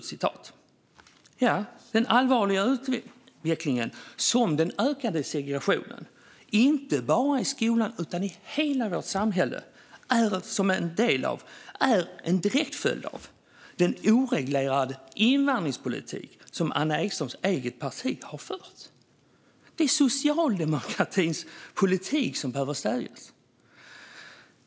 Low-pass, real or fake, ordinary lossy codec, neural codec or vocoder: none; real; none; none